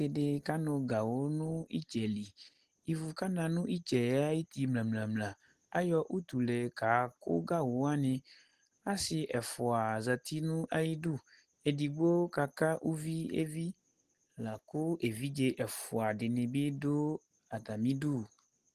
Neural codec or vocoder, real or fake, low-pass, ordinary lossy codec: none; real; 14.4 kHz; Opus, 16 kbps